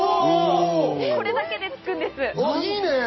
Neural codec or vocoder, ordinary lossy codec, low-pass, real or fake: none; MP3, 24 kbps; 7.2 kHz; real